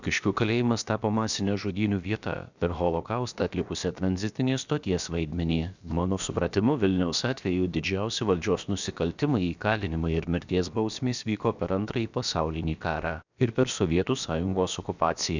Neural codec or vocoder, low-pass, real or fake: codec, 16 kHz, about 1 kbps, DyCAST, with the encoder's durations; 7.2 kHz; fake